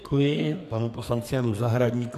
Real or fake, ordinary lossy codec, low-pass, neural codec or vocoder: fake; AAC, 64 kbps; 14.4 kHz; codec, 44.1 kHz, 2.6 kbps, SNAC